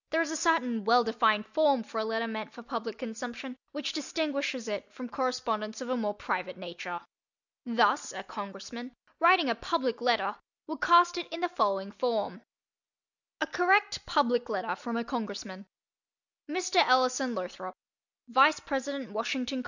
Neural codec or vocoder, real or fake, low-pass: none; real; 7.2 kHz